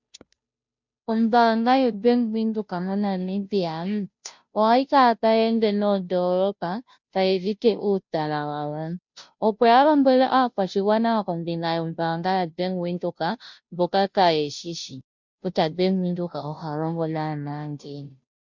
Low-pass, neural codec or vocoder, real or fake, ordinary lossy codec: 7.2 kHz; codec, 16 kHz, 0.5 kbps, FunCodec, trained on Chinese and English, 25 frames a second; fake; MP3, 64 kbps